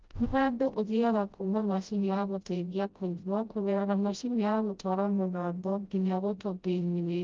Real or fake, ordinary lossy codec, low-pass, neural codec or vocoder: fake; Opus, 32 kbps; 7.2 kHz; codec, 16 kHz, 0.5 kbps, FreqCodec, smaller model